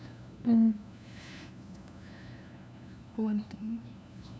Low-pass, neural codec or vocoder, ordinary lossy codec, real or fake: none; codec, 16 kHz, 1 kbps, FunCodec, trained on LibriTTS, 50 frames a second; none; fake